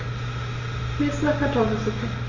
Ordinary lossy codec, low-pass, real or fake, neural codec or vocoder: Opus, 32 kbps; 7.2 kHz; real; none